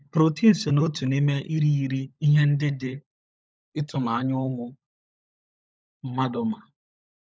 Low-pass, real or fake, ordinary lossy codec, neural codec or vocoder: none; fake; none; codec, 16 kHz, 16 kbps, FunCodec, trained on LibriTTS, 50 frames a second